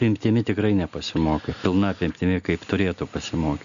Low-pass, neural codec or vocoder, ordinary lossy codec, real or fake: 7.2 kHz; none; MP3, 96 kbps; real